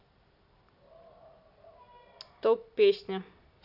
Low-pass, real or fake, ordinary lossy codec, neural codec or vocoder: 5.4 kHz; real; none; none